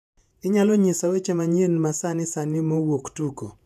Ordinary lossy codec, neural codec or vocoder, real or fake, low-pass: none; vocoder, 48 kHz, 128 mel bands, Vocos; fake; 14.4 kHz